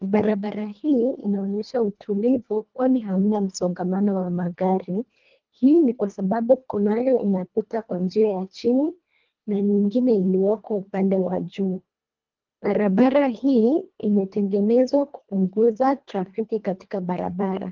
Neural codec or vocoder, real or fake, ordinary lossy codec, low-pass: codec, 24 kHz, 1.5 kbps, HILCodec; fake; Opus, 32 kbps; 7.2 kHz